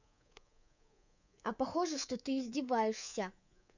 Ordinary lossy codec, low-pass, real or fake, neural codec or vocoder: none; 7.2 kHz; fake; codec, 24 kHz, 3.1 kbps, DualCodec